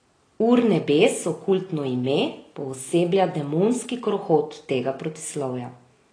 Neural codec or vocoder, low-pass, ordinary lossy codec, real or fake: none; 9.9 kHz; AAC, 32 kbps; real